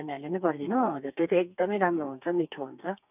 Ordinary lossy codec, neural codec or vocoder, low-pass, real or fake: none; codec, 32 kHz, 1.9 kbps, SNAC; 3.6 kHz; fake